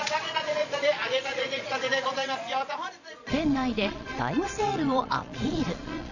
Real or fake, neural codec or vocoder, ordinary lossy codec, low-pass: fake; vocoder, 22.05 kHz, 80 mel bands, Vocos; none; 7.2 kHz